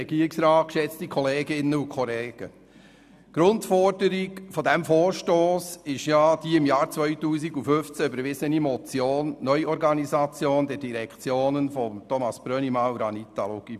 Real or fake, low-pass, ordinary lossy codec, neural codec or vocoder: real; 14.4 kHz; none; none